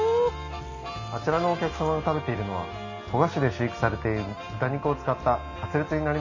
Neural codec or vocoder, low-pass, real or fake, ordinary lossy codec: none; 7.2 kHz; real; AAC, 48 kbps